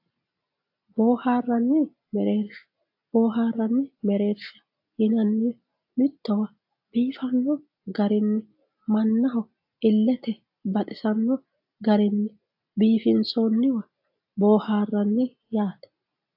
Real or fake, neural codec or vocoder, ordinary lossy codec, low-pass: real; none; AAC, 48 kbps; 5.4 kHz